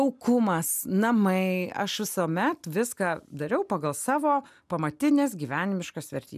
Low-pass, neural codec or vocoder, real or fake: 14.4 kHz; none; real